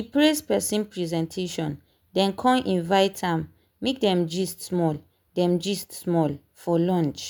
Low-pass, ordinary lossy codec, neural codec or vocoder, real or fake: none; none; none; real